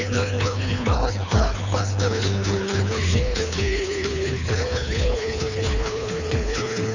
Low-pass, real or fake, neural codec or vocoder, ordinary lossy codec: 7.2 kHz; fake; codec, 24 kHz, 3 kbps, HILCodec; none